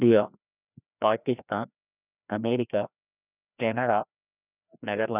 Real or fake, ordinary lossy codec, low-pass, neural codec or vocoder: fake; none; 3.6 kHz; codec, 16 kHz, 1 kbps, FreqCodec, larger model